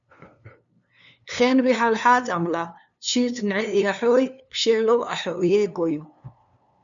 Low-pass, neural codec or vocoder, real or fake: 7.2 kHz; codec, 16 kHz, 2 kbps, FunCodec, trained on LibriTTS, 25 frames a second; fake